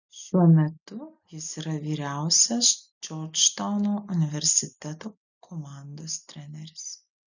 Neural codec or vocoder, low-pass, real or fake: none; 7.2 kHz; real